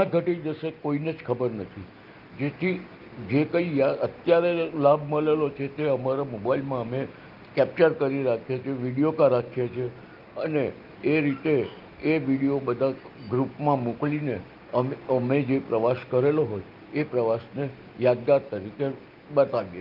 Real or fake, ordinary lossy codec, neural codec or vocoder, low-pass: real; Opus, 32 kbps; none; 5.4 kHz